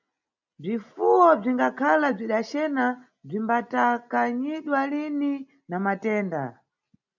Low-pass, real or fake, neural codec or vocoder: 7.2 kHz; real; none